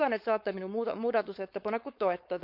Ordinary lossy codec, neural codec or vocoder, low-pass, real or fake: none; codec, 16 kHz, 4.8 kbps, FACodec; 5.4 kHz; fake